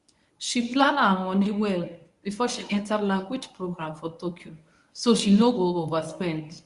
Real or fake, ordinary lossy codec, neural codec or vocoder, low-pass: fake; Opus, 64 kbps; codec, 24 kHz, 0.9 kbps, WavTokenizer, medium speech release version 1; 10.8 kHz